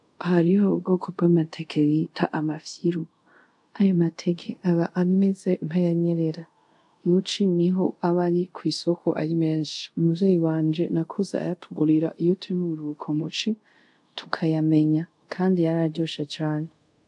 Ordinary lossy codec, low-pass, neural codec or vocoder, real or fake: AAC, 64 kbps; 10.8 kHz; codec, 24 kHz, 0.5 kbps, DualCodec; fake